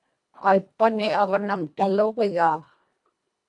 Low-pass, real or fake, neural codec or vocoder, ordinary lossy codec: 10.8 kHz; fake; codec, 24 kHz, 1.5 kbps, HILCodec; MP3, 64 kbps